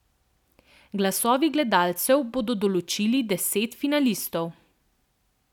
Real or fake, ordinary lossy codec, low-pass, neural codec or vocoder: real; none; 19.8 kHz; none